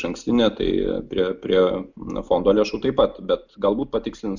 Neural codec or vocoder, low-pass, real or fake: none; 7.2 kHz; real